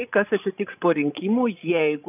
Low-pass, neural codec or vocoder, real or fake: 3.6 kHz; codec, 16 kHz, 16 kbps, FreqCodec, larger model; fake